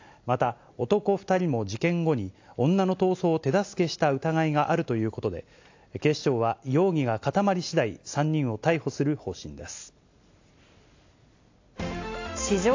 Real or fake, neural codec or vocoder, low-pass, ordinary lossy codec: real; none; 7.2 kHz; AAC, 48 kbps